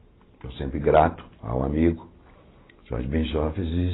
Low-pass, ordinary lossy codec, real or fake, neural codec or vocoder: 7.2 kHz; AAC, 16 kbps; real; none